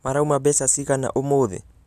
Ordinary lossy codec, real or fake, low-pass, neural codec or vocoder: none; real; 14.4 kHz; none